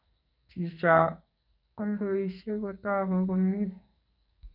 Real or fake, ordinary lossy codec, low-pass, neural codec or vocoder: fake; none; 5.4 kHz; codec, 44.1 kHz, 2.6 kbps, SNAC